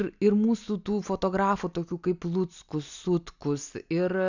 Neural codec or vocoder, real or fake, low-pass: none; real; 7.2 kHz